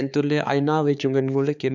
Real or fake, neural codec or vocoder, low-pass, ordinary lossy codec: fake; codec, 16 kHz, 4 kbps, X-Codec, HuBERT features, trained on balanced general audio; 7.2 kHz; none